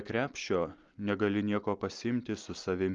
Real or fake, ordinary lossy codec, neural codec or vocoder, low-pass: real; Opus, 32 kbps; none; 7.2 kHz